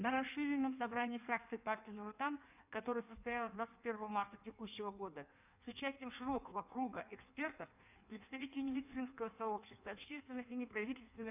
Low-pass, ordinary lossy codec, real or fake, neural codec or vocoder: 3.6 kHz; none; fake; codec, 16 kHz in and 24 kHz out, 1.1 kbps, FireRedTTS-2 codec